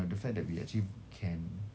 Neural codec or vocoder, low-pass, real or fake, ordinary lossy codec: none; none; real; none